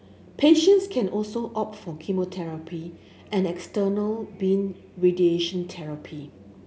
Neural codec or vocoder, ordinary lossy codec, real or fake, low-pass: none; none; real; none